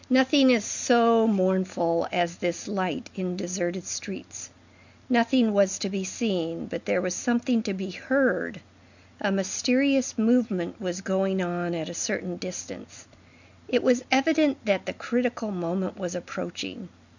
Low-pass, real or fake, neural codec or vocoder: 7.2 kHz; real; none